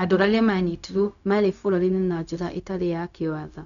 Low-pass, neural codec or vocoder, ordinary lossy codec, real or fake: 7.2 kHz; codec, 16 kHz, 0.4 kbps, LongCat-Audio-Codec; none; fake